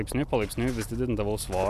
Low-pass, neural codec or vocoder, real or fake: 14.4 kHz; none; real